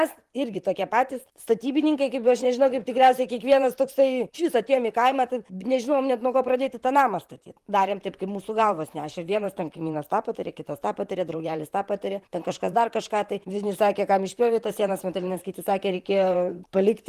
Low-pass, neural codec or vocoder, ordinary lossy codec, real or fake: 14.4 kHz; vocoder, 44.1 kHz, 128 mel bands, Pupu-Vocoder; Opus, 24 kbps; fake